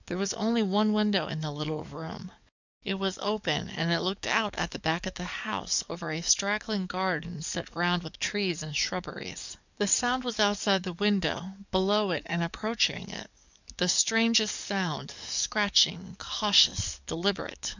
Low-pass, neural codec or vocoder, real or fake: 7.2 kHz; codec, 44.1 kHz, 7.8 kbps, DAC; fake